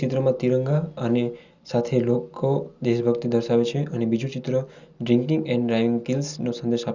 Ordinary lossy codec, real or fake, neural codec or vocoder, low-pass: Opus, 64 kbps; real; none; 7.2 kHz